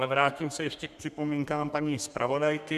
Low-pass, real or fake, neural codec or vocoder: 14.4 kHz; fake; codec, 32 kHz, 1.9 kbps, SNAC